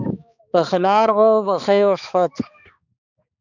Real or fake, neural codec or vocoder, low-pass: fake; codec, 16 kHz, 2 kbps, X-Codec, HuBERT features, trained on balanced general audio; 7.2 kHz